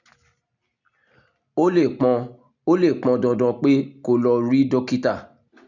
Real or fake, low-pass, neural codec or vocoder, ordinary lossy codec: real; 7.2 kHz; none; none